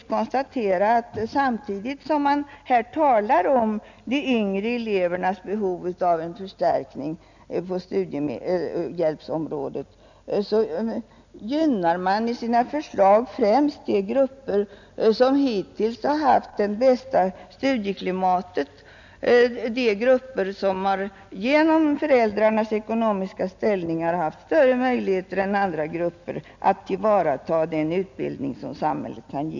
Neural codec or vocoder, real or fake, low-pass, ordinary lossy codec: none; real; 7.2 kHz; none